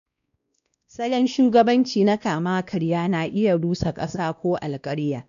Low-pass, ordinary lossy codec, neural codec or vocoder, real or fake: 7.2 kHz; none; codec, 16 kHz, 1 kbps, X-Codec, WavLM features, trained on Multilingual LibriSpeech; fake